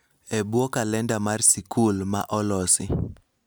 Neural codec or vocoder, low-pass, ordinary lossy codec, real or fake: none; none; none; real